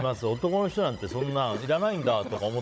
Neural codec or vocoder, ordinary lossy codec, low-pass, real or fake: codec, 16 kHz, 16 kbps, FunCodec, trained on Chinese and English, 50 frames a second; none; none; fake